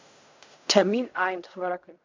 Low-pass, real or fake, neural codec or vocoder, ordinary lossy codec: 7.2 kHz; fake; codec, 16 kHz in and 24 kHz out, 0.4 kbps, LongCat-Audio-Codec, fine tuned four codebook decoder; MP3, 64 kbps